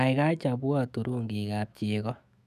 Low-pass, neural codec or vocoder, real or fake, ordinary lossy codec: 14.4 kHz; autoencoder, 48 kHz, 128 numbers a frame, DAC-VAE, trained on Japanese speech; fake; none